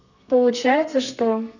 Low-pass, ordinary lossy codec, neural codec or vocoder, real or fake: 7.2 kHz; none; codec, 32 kHz, 1.9 kbps, SNAC; fake